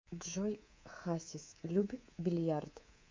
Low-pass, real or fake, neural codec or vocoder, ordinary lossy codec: 7.2 kHz; fake; codec, 24 kHz, 3.1 kbps, DualCodec; MP3, 64 kbps